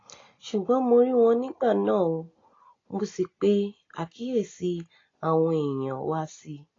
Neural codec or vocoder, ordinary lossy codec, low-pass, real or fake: none; AAC, 32 kbps; 7.2 kHz; real